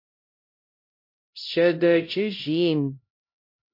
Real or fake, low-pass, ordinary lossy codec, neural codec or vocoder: fake; 5.4 kHz; MP3, 32 kbps; codec, 16 kHz, 0.5 kbps, X-Codec, HuBERT features, trained on LibriSpeech